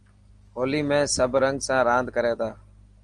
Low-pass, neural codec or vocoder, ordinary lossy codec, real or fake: 9.9 kHz; none; Opus, 24 kbps; real